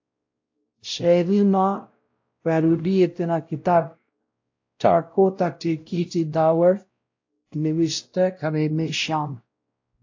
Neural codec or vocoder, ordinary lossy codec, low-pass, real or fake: codec, 16 kHz, 0.5 kbps, X-Codec, WavLM features, trained on Multilingual LibriSpeech; AAC, 48 kbps; 7.2 kHz; fake